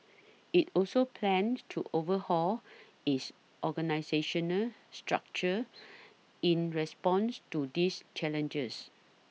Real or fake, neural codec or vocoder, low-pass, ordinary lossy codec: real; none; none; none